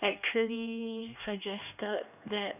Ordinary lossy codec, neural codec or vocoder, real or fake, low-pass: none; codec, 16 kHz, 2 kbps, X-Codec, HuBERT features, trained on LibriSpeech; fake; 3.6 kHz